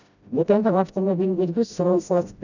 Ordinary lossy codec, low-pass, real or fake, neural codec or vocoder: none; 7.2 kHz; fake; codec, 16 kHz, 0.5 kbps, FreqCodec, smaller model